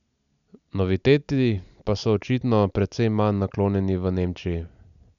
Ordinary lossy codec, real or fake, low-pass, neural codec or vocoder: none; real; 7.2 kHz; none